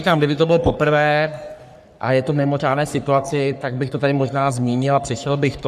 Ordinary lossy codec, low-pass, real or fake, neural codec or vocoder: MP3, 96 kbps; 14.4 kHz; fake; codec, 44.1 kHz, 3.4 kbps, Pupu-Codec